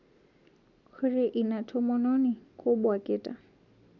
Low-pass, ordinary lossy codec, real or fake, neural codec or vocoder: 7.2 kHz; none; real; none